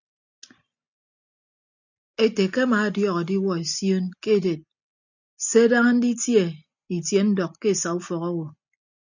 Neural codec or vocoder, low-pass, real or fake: none; 7.2 kHz; real